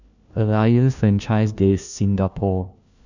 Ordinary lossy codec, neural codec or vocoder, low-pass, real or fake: none; codec, 16 kHz, 1 kbps, FunCodec, trained on LibriTTS, 50 frames a second; 7.2 kHz; fake